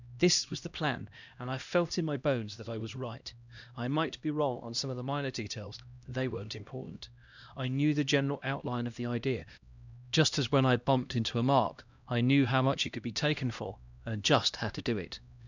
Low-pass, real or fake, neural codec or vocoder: 7.2 kHz; fake; codec, 16 kHz, 1 kbps, X-Codec, HuBERT features, trained on LibriSpeech